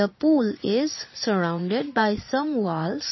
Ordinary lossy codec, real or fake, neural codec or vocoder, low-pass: MP3, 24 kbps; fake; vocoder, 22.05 kHz, 80 mel bands, Vocos; 7.2 kHz